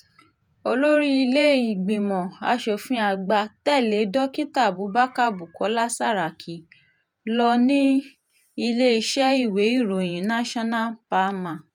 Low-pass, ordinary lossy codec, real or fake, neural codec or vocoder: 19.8 kHz; none; fake; vocoder, 48 kHz, 128 mel bands, Vocos